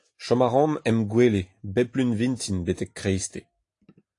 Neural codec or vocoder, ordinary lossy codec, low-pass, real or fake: none; AAC, 48 kbps; 10.8 kHz; real